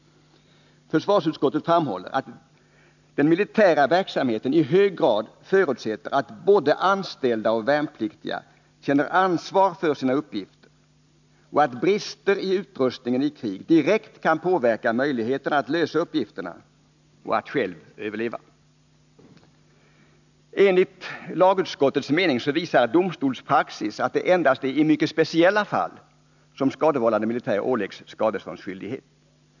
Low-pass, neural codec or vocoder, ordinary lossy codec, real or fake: 7.2 kHz; none; none; real